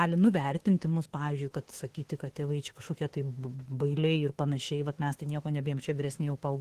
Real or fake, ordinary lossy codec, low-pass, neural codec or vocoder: fake; Opus, 16 kbps; 14.4 kHz; autoencoder, 48 kHz, 32 numbers a frame, DAC-VAE, trained on Japanese speech